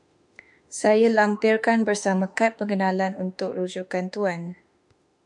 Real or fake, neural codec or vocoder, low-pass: fake; autoencoder, 48 kHz, 32 numbers a frame, DAC-VAE, trained on Japanese speech; 10.8 kHz